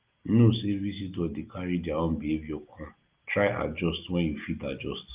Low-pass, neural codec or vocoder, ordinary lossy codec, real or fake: 3.6 kHz; none; Opus, 32 kbps; real